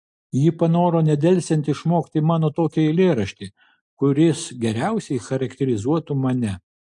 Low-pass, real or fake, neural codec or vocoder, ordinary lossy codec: 10.8 kHz; real; none; MP3, 64 kbps